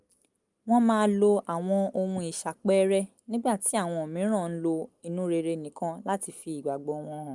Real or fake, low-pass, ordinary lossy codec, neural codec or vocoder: real; 10.8 kHz; Opus, 32 kbps; none